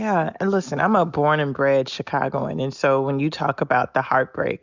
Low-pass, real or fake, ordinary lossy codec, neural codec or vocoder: 7.2 kHz; real; Opus, 64 kbps; none